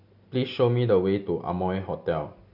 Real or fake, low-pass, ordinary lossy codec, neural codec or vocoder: real; 5.4 kHz; none; none